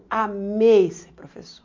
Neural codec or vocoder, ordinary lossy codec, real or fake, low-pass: none; MP3, 48 kbps; real; 7.2 kHz